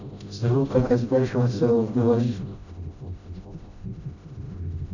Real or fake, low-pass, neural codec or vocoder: fake; 7.2 kHz; codec, 16 kHz, 0.5 kbps, FreqCodec, smaller model